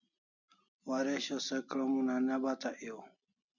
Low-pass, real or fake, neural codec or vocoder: 7.2 kHz; real; none